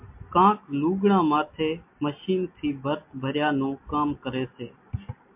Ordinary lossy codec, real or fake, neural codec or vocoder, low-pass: MP3, 32 kbps; real; none; 3.6 kHz